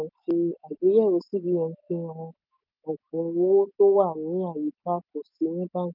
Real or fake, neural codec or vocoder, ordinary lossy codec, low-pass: real; none; none; 5.4 kHz